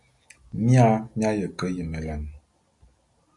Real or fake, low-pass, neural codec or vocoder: real; 10.8 kHz; none